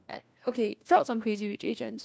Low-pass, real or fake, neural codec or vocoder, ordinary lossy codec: none; fake; codec, 16 kHz, 1 kbps, FunCodec, trained on LibriTTS, 50 frames a second; none